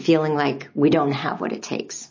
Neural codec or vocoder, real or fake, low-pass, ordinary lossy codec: none; real; 7.2 kHz; MP3, 32 kbps